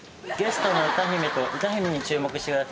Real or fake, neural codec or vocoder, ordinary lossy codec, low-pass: real; none; none; none